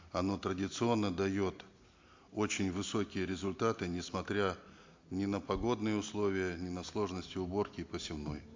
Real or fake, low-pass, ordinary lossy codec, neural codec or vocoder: real; 7.2 kHz; MP3, 48 kbps; none